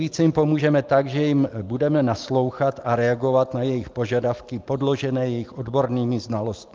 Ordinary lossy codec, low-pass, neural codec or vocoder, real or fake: Opus, 32 kbps; 7.2 kHz; none; real